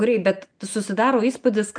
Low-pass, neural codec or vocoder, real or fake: 9.9 kHz; none; real